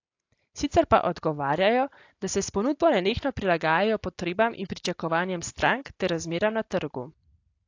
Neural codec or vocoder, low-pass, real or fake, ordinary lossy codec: none; 7.2 kHz; real; AAC, 48 kbps